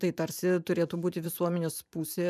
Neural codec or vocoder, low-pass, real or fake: vocoder, 44.1 kHz, 128 mel bands every 512 samples, BigVGAN v2; 14.4 kHz; fake